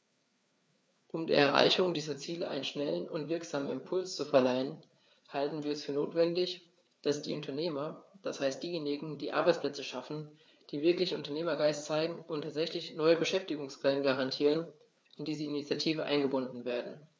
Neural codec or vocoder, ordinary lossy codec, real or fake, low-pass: codec, 16 kHz, 4 kbps, FreqCodec, larger model; none; fake; none